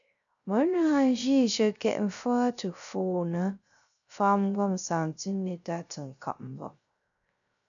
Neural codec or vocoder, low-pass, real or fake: codec, 16 kHz, 0.3 kbps, FocalCodec; 7.2 kHz; fake